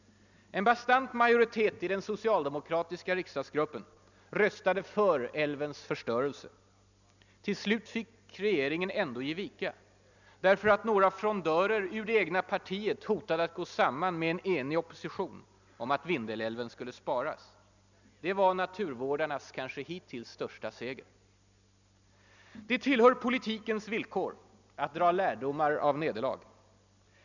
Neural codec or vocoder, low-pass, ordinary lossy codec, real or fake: none; 7.2 kHz; none; real